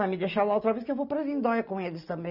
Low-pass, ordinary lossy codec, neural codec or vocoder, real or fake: 5.4 kHz; none; none; real